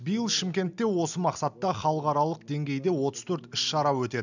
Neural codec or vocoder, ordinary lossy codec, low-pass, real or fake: none; none; 7.2 kHz; real